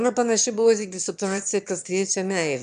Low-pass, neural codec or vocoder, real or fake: 9.9 kHz; autoencoder, 22.05 kHz, a latent of 192 numbers a frame, VITS, trained on one speaker; fake